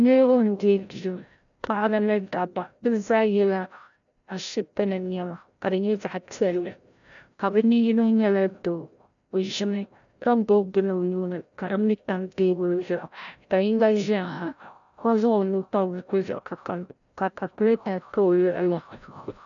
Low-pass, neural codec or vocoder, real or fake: 7.2 kHz; codec, 16 kHz, 0.5 kbps, FreqCodec, larger model; fake